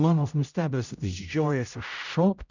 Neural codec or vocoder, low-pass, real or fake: codec, 16 kHz, 0.5 kbps, X-Codec, HuBERT features, trained on general audio; 7.2 kHz; fake